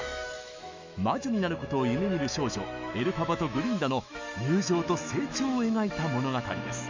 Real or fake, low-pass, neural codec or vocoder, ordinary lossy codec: fake; 7.2 kHz; autoencoder, 48 kHz, 128 numbers a frame, DAC-VAE, trained on Japanese speech; MP3, 64 kbps